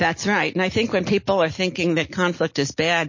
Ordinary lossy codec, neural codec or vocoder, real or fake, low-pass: MP3, 32 kbps; none; real; 7.2 kHz